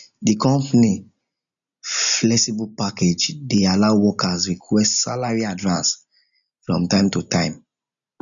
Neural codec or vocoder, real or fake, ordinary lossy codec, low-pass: none; real; none; 7.2 kHz